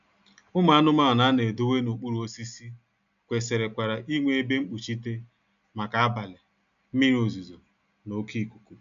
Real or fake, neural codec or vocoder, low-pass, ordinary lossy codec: real; none; 7.2 kHz; none